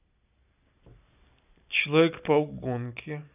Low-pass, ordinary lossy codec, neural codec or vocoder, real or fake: 3.6 kHz; none; none; real